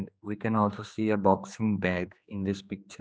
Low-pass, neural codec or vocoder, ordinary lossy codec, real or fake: none; codec, 16 kHz, 2 kbps, X-Codec, HuBERT features, trained on general audio; none; fake